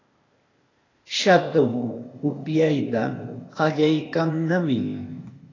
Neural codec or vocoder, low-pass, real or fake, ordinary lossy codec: codec, 16 kHz, 0.8 kbps, ZipCodec; 7.2 kHz; fake; AAC, 32 kbps